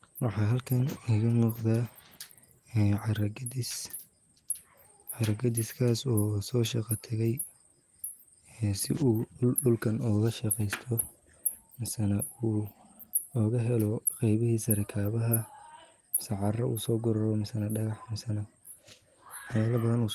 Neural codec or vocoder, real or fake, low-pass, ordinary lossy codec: none; real; 14.4 kHz; Opus, 24 kbps